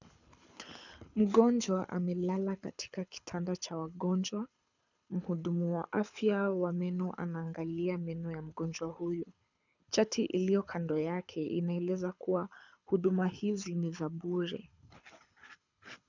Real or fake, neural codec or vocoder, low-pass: fake; codec, 24 kHz, 6 kbps, HILCodec; 7.2 kHz